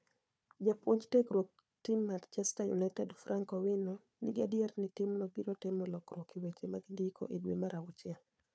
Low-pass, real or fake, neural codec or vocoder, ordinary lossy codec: none; fake; codec, 16 kHz, 4 kbps, FunCodec, trained on Chinese and English, 50 frames a second; none